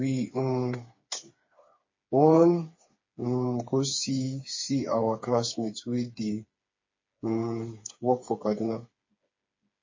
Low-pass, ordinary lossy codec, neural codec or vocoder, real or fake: 7.2 kHz; MP3, 32 kbps; codec, 16 kHz, 4 kbps, FreqCodec, smaller model; fake